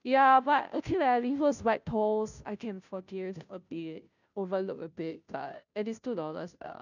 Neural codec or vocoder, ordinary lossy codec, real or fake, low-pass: codec, 16 kHz, 0.5 kbps, FunCodec, trained on Chinese and English, 25 frames a second; none; fake; 7.2 kHz